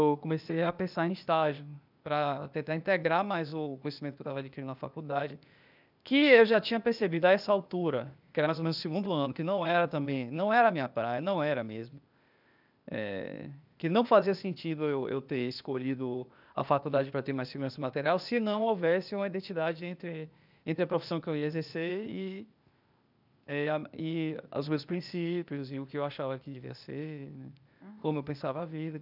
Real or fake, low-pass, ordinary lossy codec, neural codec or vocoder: fake; 5.4 kHz; none; codec, 16 kHz, 0.8 kbps, ZipCodec